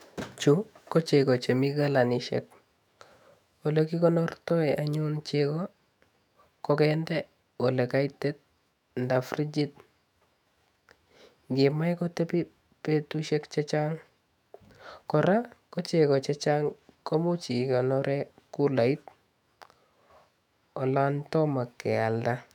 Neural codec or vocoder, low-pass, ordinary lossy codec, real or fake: autoencoder, 48 kHz, 128 numbers a frame, DAC-VAE, trained on Japanese speech; 19.8 kHz; none; fake